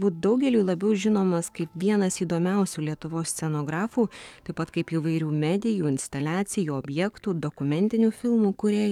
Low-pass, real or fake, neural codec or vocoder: 19.8 kHz; fake; codec, 44.1 kHz, 7.8 kbps, DAC